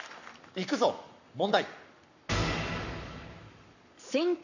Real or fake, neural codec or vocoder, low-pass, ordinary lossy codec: fake; codec, 44.1 kHz, 7.8 kbps, Pupu-Codec; 7.2 kHz; none